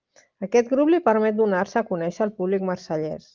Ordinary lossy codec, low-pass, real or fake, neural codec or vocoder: Opus, 32 kbps; 7.2 kHz; real; none